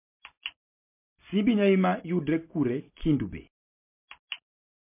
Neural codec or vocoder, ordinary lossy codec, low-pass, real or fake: none; MP3, 24 kbps; 3.6 kHz; real